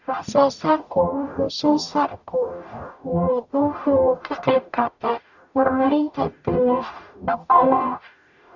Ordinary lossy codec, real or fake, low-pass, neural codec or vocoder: none; fake; 7.2 kHz; codec, 44.1 kHz, 0.9 kbps, DAC